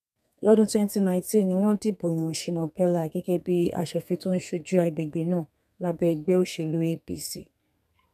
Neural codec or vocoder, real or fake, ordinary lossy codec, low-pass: codec, 32 kHz, 1.9 kbps, SNAC; fake; none; 14.4 kHz